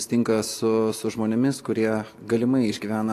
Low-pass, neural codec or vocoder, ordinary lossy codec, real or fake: 14.4 kHz; none; AAC, 64 kbps; real